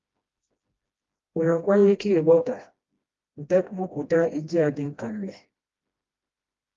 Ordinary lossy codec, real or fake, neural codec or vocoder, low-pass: Opus, 32 kbps; fake; codec, 16 kHz, 1 kbps, FreqCodec, smaller model; 7.2 kHz